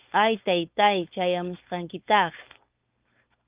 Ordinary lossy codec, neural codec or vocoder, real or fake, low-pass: Opus, 24 kbps; codec, 16 kHz, 4.8 kbps, FACodec; fake; 3.6 kHz